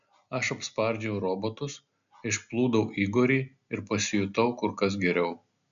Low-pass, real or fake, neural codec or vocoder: 7.2 kHz; real; none